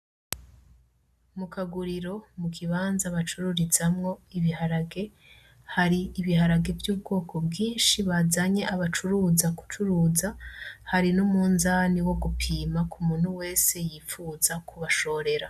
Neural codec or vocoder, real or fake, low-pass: none; real; 14.4 kHz